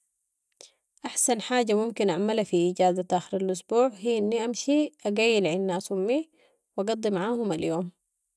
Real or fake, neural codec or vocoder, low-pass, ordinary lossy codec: real; none; none; none